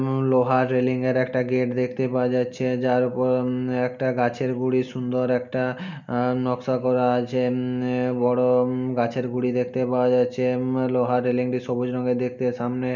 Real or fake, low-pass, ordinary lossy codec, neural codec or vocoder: real; 7.2 kHz; none; none